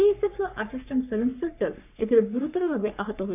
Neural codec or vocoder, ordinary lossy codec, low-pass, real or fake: codec, 44.1 kHz, 3.4 kbps, Pupu-Codec; none; 3.6 kHz; fake